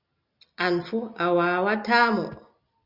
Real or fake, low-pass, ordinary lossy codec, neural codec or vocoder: real; 5.4 kHz; Opus, 64 kbps; none